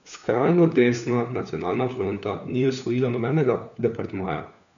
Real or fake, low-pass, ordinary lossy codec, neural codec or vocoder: fake; 7.2 kHz; none; codec, 16 kHz, 4 kbps, FunCodec, trained on LibriTTS, 50 frames a second